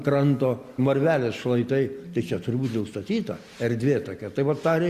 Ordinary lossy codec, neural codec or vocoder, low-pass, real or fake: Opus, 64 kbps; none; 14.4 kHz; real